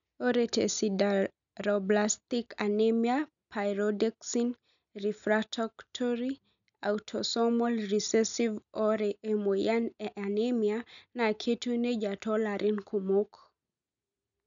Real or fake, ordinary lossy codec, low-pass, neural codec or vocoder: real; none; 7.2 kHz; none